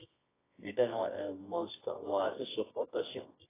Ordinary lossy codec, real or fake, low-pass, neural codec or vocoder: AAC, 16 kbps; fake; 3.6 kHz; codec, 24 kHz, 0.9 kbps, WavTokenizer, medium music audio release